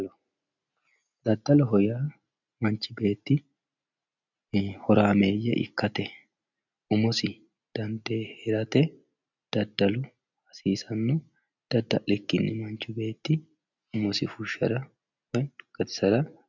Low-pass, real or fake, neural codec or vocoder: 7.2 kHz; real; none